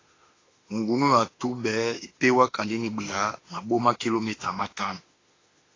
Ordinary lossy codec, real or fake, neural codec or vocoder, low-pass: AAC, 32 kbps; fake; autoencoder, 48 kHz, 32 numbers a frame, DAC-VAE, trained on Japanese speech; 7.2 kHz